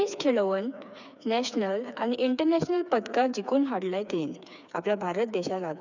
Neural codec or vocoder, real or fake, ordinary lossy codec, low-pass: codec, 16 kHz, 4 kbps, FreqCodec, smaller model; fake; none; 7.2 kHz